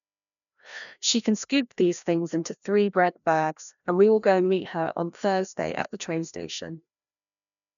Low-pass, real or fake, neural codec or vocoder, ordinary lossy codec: 7.2 kHz; fake; codec, 16 kHz, 1 kbps, FreqCodec, larger model; none